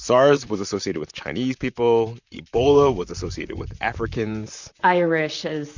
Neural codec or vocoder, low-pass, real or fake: vocoder, 44.1 kHz, 128 mel bands, Pupu-Vocoder; 7.2 kHz; fake